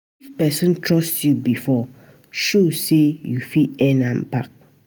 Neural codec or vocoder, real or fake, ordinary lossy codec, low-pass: none; real; none; none